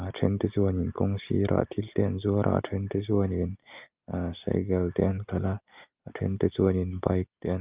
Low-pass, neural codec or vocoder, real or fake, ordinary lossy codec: 3.6 kHz; none; real; Opus, 32 kbps